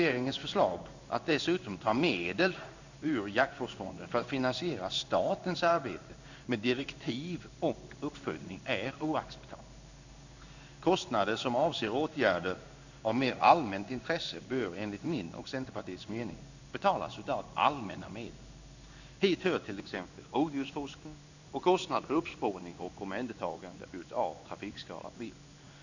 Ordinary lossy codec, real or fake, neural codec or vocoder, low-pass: none; fake; codec, 16 kHz in and 24 kHz out, 1 kbps, XY-Tokenizer; 7.2 kHz